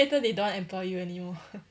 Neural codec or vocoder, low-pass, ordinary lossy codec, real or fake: none; none; none; real